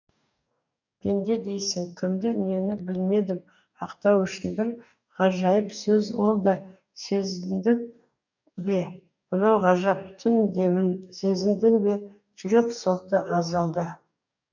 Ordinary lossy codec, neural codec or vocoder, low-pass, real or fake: none; codec, 44.1 kHz, 2.6 kbps, DAC; 7.2 kHz; fake